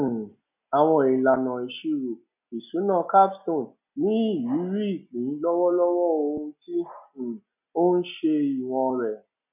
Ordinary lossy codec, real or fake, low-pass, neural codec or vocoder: none; real; 3.6 kHz; none